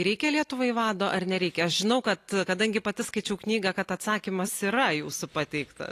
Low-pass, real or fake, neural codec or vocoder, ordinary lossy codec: 14.4 kHz; real; none; AAC, 48 kbps